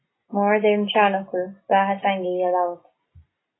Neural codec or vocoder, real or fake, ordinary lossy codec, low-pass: none; real; AAC, 16 kbps; 7.2 kHz